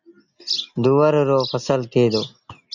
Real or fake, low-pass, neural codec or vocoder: real; 7.2 kHz; none